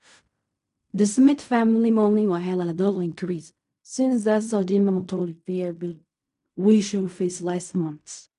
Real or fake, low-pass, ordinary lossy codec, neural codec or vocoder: fake; 10.8 kHz; MP3, 96 kbps; codec, 16 kHz in and 24 kHz out, 0.4 kbps, LongCat-Audio-Codec, fine tuned four codebook decoder